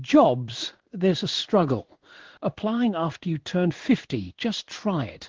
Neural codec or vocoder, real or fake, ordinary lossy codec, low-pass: codec, 16 kHz in and 24 kHz out, 1 kbps, XY-Tokenizer; fake; Opus, 16 kbps; 7.2 kHz